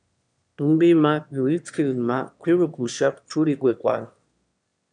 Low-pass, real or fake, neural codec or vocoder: 9.9 kHz; fake; autoencoder, 22.05 kHz, a latent of 192 numbers a frame, VITS, trained on one speaker